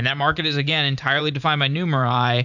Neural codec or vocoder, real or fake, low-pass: vocoder, 44.1 kHz, 128 mel bands every 512 samples, BigVGAN v2; fake; 7.2 kHz